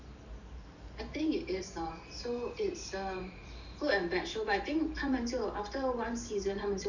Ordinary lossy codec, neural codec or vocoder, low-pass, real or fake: none; vocoder, 44.1 kHz, 128 mel bands every 512 samples, BigVGAN v2; 7.2 kHz; fake